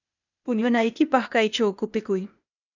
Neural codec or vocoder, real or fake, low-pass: codec, 16 kHz, 0.8 kbps, ZipCodec; fake; 7.2 kHz